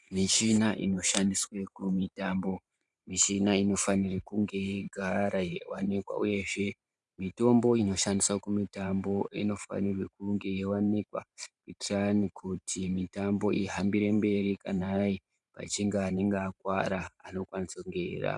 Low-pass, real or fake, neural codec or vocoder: 10.8 kHz; fake; vocoder, 44.1 kHz, 128 mel bands every 512 samples, BigVGAN v2